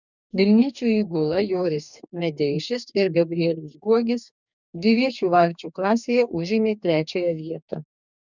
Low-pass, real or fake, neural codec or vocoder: 7.2 kHz; fake; codec, 44.1 kHz, 2.6 kbps, DAC